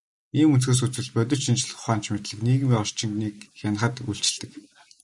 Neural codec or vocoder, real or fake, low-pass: none; real; 10.8 kHz